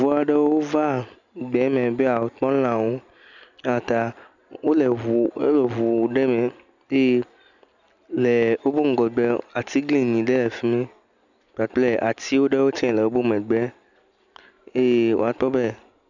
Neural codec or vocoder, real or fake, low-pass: none; real; 7.2 kHz